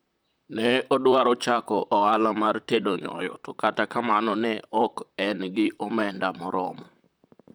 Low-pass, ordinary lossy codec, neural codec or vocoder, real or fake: none; none; vocoder, 44.1 kHz, 128 mel bands, Pupu-Vocoder; fake